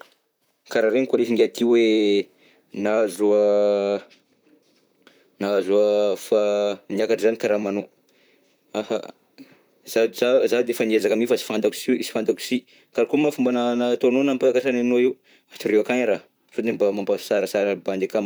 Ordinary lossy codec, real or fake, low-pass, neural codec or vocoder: none; fake; none; vocoder, 44.1 kHz, 128 mel bands, Pupu-Vocoder